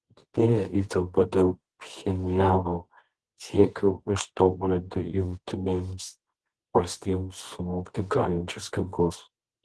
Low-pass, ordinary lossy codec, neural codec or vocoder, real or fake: 10.8 kHz; Opus, 16 kbps; codec, 24 kHz, 0.9 kbps, WavTokenizer, medium music audio release; fake